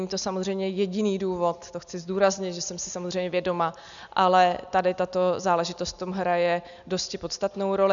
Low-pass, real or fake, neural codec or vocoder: 7.2 kHz; real; none